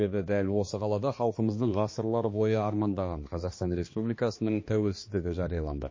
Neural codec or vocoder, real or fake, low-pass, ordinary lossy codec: codec, 16 kHz, 2 kbps, X-Codec, HuBERT features, trained on balanced general audio; fake; 7.2 kHz; MP3, 32 kbps